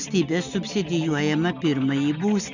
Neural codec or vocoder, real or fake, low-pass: none; real; 7.2 kHz